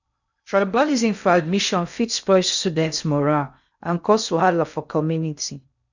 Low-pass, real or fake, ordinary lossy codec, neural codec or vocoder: 7.2 kHz; fake; none; codec, 16 kHz in and 24 kHz out, 0.6 kbps, FocalCodec, streaming, 2048 codes